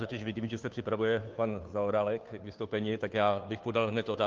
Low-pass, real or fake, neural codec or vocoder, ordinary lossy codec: 7.2 kHz; fake; codec, 16 kHz, 2 kbps, FunCodec, trained on Chinese and English, 25 frames a second; Opus, 24 kbps